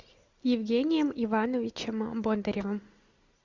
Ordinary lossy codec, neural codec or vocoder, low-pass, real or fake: Opus, 64 kbps; none; 7.2 kHz; real